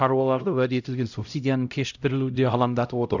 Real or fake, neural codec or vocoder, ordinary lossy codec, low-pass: fake; codec, 16 kHz, 0.5 kbps, X-Codec, HuBERT features, trained on LibriSpeech; none; 7.2 kHz